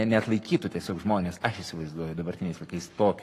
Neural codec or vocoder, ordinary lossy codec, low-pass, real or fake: codec, 44.1 kHz, 7.8 kbps, Pupu-Codec; AAC, 48 kbps; 14.4 kHz; fake